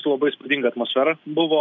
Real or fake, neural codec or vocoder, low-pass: real; none; 7.2 kHz